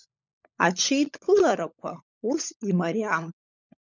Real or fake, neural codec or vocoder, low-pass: fake; codec, 16 kHz, 16 kbps, FunCodec, trained on LibriTTS, 50 frames a second; 7.2 kHz